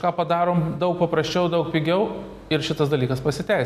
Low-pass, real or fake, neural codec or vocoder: 14.4 kHz; real; none